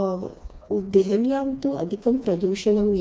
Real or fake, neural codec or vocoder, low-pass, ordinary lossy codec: fake; codec, 16 kHz, 2 kbps, FreqCodec, smaller model; none; none